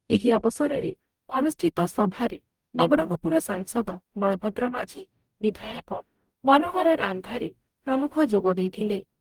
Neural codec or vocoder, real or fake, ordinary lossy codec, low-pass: codec, 44.1 kHz, 0.9 kbps, DAC; fake; Opus, 32 kbps; 19.8 kHz